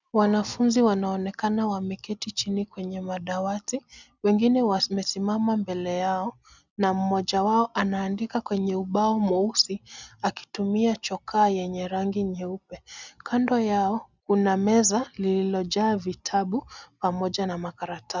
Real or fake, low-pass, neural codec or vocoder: real; 7.2 kHz; none